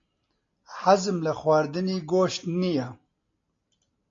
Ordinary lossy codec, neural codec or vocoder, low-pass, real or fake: AAC, 48 kbps; none; 7.2 kHz; real